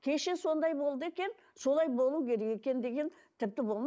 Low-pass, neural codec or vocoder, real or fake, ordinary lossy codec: none; none; real; none